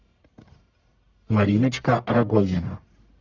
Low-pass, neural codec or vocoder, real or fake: 7.2 kHz; codec, 44.1 kHz, 1.7 kbps, Pupu-Codec; fake